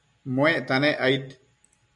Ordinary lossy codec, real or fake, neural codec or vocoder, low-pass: MP3, 64 kbps; real; none; 10.8 kHz